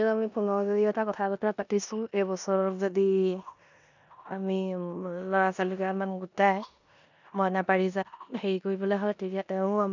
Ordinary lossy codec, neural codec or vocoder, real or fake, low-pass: none; codec, 16 kHz in and 24 kHz out, 0.9 kbps, LongCat-Audio-Codec, four codebook decoder; fake; 7.2 kHz